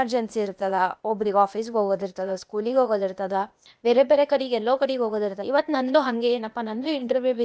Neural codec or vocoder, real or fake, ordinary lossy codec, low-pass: codec, 16 kHz, 0.8 kbps, ZipCodec; fake; none; none